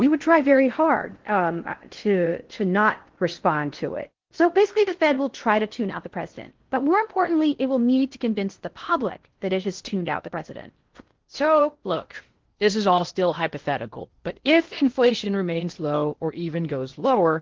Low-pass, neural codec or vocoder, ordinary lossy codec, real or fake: 7.2 kHz; codec, 16 kHz in and 24 kHz out, 0.6 kbps, FocalCodec, streaming, 4096 codes; Opus, 16 kbps; fake